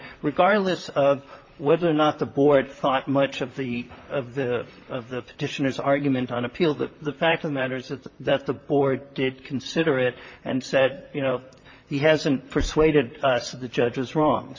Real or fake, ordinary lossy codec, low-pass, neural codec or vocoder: fake; MP3, 32 kbps; 7.2 kHz; vocoder, 44.1 kHz, 128 mel bands, Pupu-Vocoder